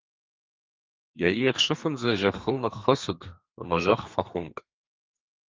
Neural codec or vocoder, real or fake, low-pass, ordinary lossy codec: codec, 32 kHz, 1.9 kbps, SNAC; fake; 7.2 kHz; Opus, 24 kbps